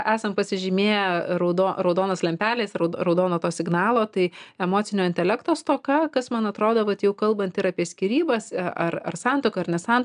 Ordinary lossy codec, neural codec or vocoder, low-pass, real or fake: AAC, 96 kbps; none; 9.9 kHz; real